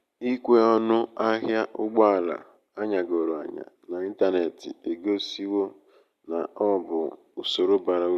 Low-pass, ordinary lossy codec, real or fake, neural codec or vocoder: 14.4 kHz; Opus, 64 kbps; real; none